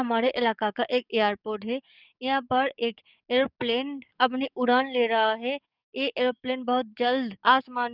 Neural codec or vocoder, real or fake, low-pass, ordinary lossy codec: codec, 44.1 kHz, 7.8 kbps, DAC; fake; 5.4 kHz; none